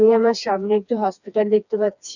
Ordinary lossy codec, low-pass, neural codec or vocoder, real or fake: none; 7.2 kHz; codec, 16 kHz, 2 kbps, FreqCodec, smaller model; fake